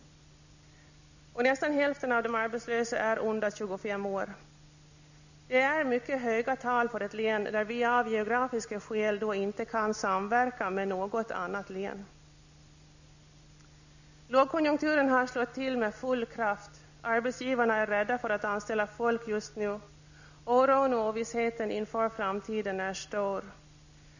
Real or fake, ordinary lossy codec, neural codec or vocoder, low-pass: real; none; none; 7.2 kHz